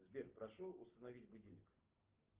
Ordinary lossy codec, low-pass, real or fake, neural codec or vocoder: Opus, 16 kbps; 3.6 kHz; real; none